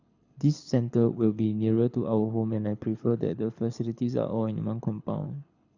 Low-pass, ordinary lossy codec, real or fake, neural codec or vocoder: 7.2 kHz; none; fake; codec, 24 kHz, 6 kbps, HILCodec